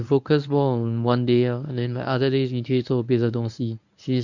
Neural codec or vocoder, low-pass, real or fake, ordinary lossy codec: codec, 24 kHz, 0.9 kbps, WavTokenizer, medium speech release version 1; 7.2 kHz; fake; none